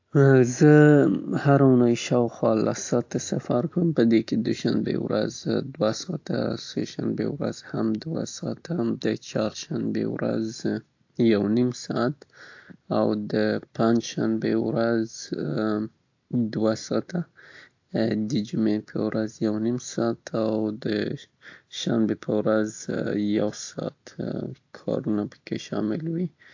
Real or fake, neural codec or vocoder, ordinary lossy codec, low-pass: real; none; AAC, 48 kbps; 7.2 kHz